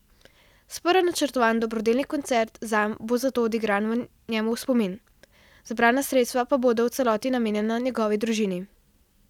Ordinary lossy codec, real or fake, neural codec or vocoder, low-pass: none; real; none; 19.8 kHz